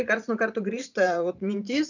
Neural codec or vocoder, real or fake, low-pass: vocoder, 44.1 kHz, 128 mel bands every 512 samples, BigVGAN v2; fake; 7.2 kHz